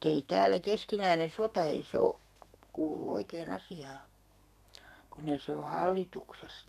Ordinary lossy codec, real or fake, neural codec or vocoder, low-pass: none; fake; codec, 32 kHz, 1.9 kbps, SNAC; 14.4 kHz